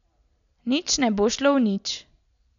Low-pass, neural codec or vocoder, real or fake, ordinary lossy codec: 7.2 kHz; none; real; none